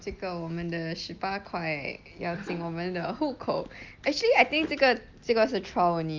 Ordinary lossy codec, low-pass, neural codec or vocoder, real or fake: Opus, 24 kbps; 7.2 kHz; none; real